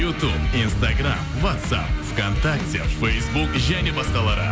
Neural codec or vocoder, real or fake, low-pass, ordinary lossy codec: none; real; none; none